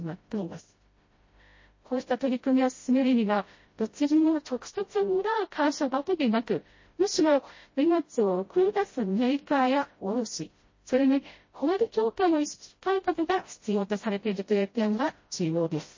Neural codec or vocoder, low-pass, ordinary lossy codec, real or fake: codec, 16 kHz, 0.5 kbps, FreqCodec, smaller model; 7.2 kHz; MP3, 32 kbps; fake